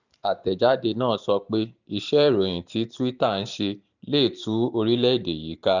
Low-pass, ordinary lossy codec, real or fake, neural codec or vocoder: 7.2 kHz; none; fake; vocoder, 44.1 kHz, 128 mel bands every 512 samples, BigVGAN v2